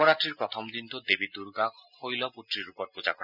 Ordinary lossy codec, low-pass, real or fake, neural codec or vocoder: none; 5.4 kHz; real; none